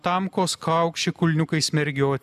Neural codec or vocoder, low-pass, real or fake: none; 14.4 kHz; real